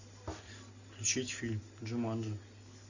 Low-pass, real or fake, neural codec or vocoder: 7.2 kHz; real; none